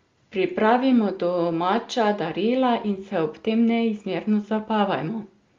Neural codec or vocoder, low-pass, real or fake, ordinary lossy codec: none; 7.2 kHz; real; Opus, 32 kbps